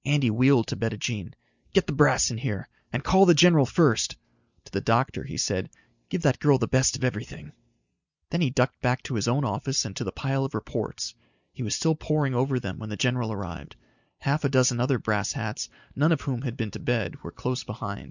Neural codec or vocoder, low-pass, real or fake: none; 7.2 kHz; real